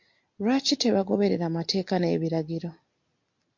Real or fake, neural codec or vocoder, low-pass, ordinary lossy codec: real; none; 7.2 kHz; MP3, 48 kbps